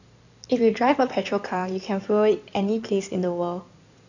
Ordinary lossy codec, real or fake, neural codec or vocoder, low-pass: none; fake; codec, 16 kHz in and 24 kHz out, 2.2 kbps, FireRedTTS-2 codec; 7.2 kHz